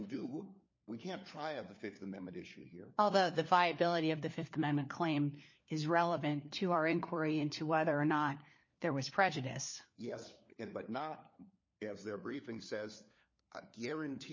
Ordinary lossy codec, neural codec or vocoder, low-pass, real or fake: MP3, 32 kbps; codec, 16 kHz, 4 kbps, FunCodec, trained on LibriTTS, 50 frames a second; 7.2 kHz; fake